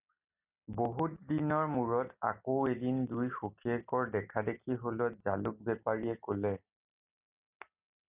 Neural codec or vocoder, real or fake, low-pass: none; real; 3.6 kHz